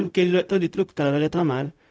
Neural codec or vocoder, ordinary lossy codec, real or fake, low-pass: codec, 16 kHz, 0.4 kbps, LongCat-Audio-Codec; none; fake; none